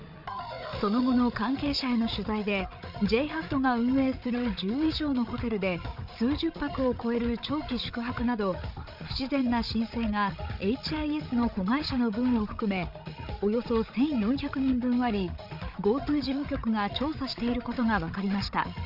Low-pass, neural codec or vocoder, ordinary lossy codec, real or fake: 5.4 kHz; codec, 16 kHz, 8 kbps, FreqCodec, larger model; none; fake